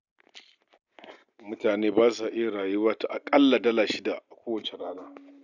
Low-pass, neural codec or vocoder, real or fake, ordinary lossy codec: 7.2 kHz; none; real; none